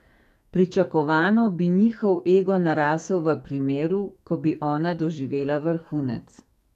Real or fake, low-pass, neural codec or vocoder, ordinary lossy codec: fake; 14.4 kHz; codec, 44.1 kHz, 2.6 kbps, SNAC; none